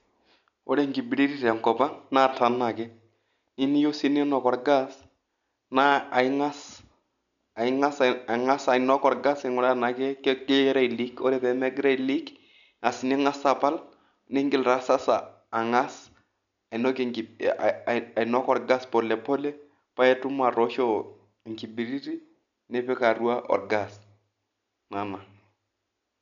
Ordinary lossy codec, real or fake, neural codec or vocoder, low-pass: none; real; none; 7.2 kHz